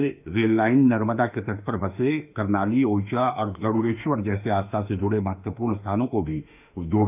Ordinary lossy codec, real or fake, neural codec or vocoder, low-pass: none; fake; autoencoder, 48 kHz, 32 numbers a frame, DAC-VAE, trained on Japanese speech; 3.6 kHz